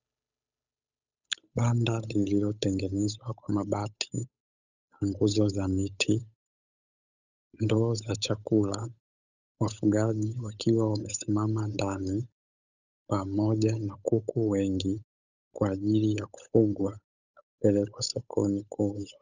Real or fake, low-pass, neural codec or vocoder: fake; 7.2 kHz; codec, 16 kHz, 8 kbps, FunCodec, trained on Chinese and English, 25 frames a second